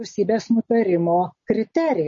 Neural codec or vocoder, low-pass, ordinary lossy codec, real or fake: none; 7.2 kHz; MP3, 32 kbps; real